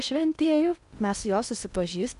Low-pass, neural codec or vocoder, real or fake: 10.8 kHz; codec, 16 kHz in and 24 kHz out, 0.8 kbps, FocalCodec, streaming, 65536 codes; fake